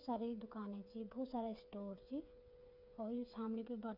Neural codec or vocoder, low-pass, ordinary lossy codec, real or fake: codec, 16 kHz, 8 kbps, FreqCodec, smaller model; 5.4 kHz; none; fake